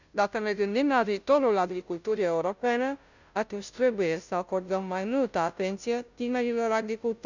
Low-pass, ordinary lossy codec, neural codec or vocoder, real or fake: 7.2 kHz; none; codec, 16 kHz, 0.5 kbps, FunCodec, trained on Chinese and English, 25 frames a second; fake